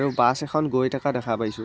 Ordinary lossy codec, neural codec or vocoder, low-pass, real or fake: none; none; none; real